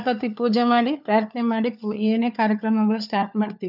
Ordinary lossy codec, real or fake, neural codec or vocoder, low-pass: none; fake; codec, 16 kHz, 4 kbps, FunCodec, trained on LibriTTS, 50 frames a second; 5.4 kHz